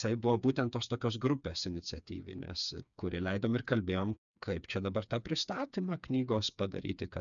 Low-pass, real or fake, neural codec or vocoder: 7.2 kHz; fake; codec, 16 kHz, 4 kbps, FreqCodec, smaller model